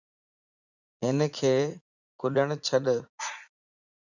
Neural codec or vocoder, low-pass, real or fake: vocoder, 44.1 kHz, 80 mel bands, Vocos; 7.2 kHz; fake